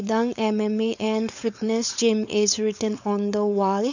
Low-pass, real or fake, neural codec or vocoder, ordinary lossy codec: 7.2 kHz; fake; codec, 16 kHz, 4.8 kbps, FACodec; none